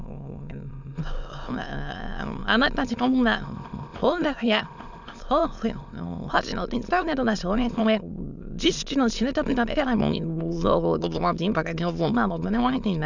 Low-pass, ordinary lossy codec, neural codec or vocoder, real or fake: 7.2 kHz; none; autoencoder, 22.05 kHz, a latent of 192 numbers a frame, VITS, trained on many speakers; fake